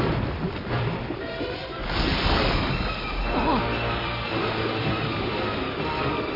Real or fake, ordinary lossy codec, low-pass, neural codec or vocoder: fake; AAC, 24 kbps; 5.4 kHz; vocoder, 44.1 kHz, 128 mel bands every 256 samples, BigVGAN v2